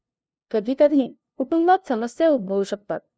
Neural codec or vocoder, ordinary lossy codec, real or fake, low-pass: codec, 16 kHz, 0.5 kbps, FunCodec, trained on LibriTTS, 25 frames a second; none; fake; none